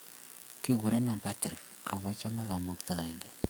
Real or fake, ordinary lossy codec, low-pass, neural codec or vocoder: fake; none; none; codec, 44.1 kHz, 2.6 kbps, SNAC